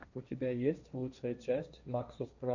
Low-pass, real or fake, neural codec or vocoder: 7.2 kHz; fake; codec, 16 kHz, 1.1 kbps, Voila-Tokenizer